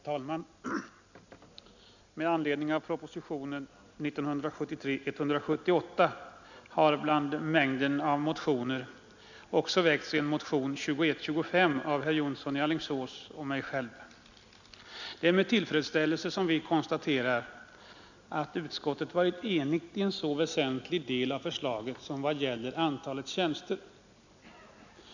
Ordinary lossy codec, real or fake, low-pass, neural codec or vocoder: none; real; 7.2 kHz; none